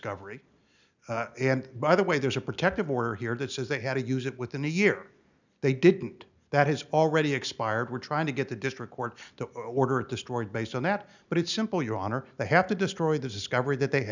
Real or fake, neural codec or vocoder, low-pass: real; none; 7.2 kHz